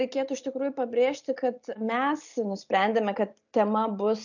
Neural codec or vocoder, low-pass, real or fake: none; 7.2 kHz; real